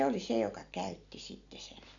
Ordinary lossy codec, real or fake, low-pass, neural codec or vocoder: none; real; 7.2 kHz; none